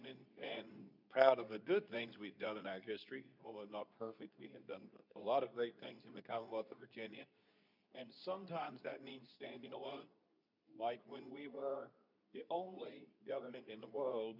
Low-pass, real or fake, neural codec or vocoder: 5.4 kHz; fake; codec, 24 kHz, 0.9 kbps, WavTokenizer, medium speech release version 2